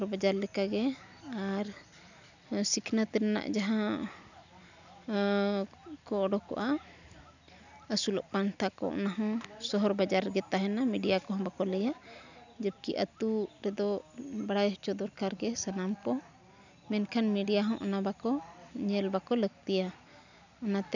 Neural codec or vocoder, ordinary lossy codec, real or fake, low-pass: none; none; real; 7.2 kHz